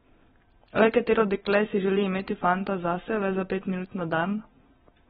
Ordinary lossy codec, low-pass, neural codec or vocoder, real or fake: AAC, 16 kbps; 19.8 kHz; none; real